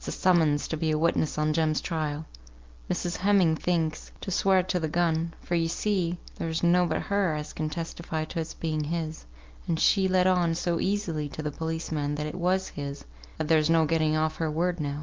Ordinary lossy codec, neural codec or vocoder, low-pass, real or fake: Opus, 32 kbps; none; 7.2 kHz; real